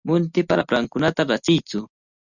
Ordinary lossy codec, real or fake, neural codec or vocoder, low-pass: Opus, 64 kbps; real; none; 7.2 kHz